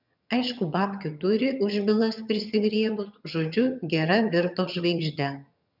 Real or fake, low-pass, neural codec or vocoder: fake; 5.4 kHz; vocoder, 22.05 kHz, 80 mel bands, HiFi-GAN